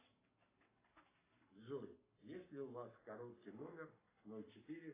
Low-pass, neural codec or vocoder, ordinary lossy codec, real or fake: 3.6 kHz; codec, 44.1 kHz, 7.8 kbps, Pupu-Codec; AAC, 16 kbps; fake